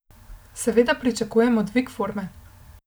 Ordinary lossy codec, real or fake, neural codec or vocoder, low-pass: none; real; none; none